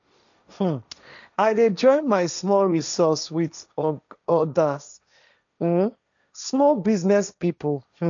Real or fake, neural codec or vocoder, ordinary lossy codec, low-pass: fake; codec, 16 kHz, 1.1 kbps, Voila-Tokenizer; none; 7.2 kHz